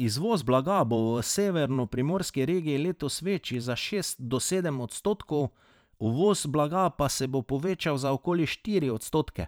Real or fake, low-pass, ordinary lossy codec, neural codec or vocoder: fake; none; none; vocoder, 44.1 kHz, 128 mel bands every 512 samples, BigVGAN v2